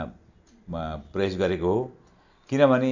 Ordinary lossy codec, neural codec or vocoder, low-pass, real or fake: none; none; 7.2 kHz; real